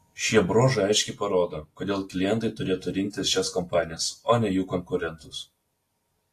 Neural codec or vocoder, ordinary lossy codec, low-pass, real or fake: none; AAC, 48 kbps; 14.4 kHz; real